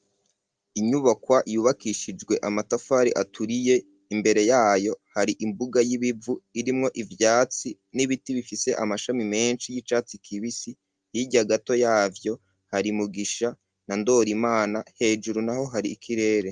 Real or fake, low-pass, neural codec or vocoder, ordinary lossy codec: real; 9.9 kHz; none; Opus, 32 kbps